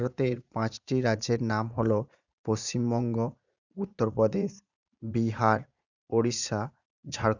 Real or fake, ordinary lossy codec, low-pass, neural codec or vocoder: fake; none; 7.2 kHz; vocoder, 44.1 kHz, 80 mel bands, Vocos